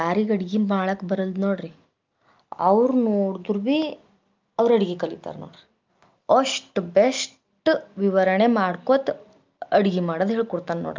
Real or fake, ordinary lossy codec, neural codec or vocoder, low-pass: real; Opus, 24 kbps; none; 7.2 kHz